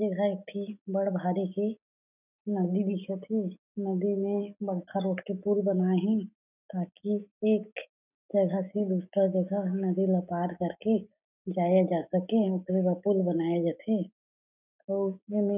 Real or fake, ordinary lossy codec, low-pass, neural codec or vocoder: real; none; 3.6 kHz; none